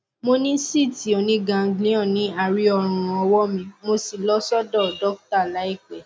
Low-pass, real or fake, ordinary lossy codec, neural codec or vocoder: none; real; none; none